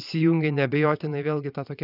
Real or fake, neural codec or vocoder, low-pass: fake; vocoder, 22.05 kHz, 80 mel bands, Vocos; 5.4 kHz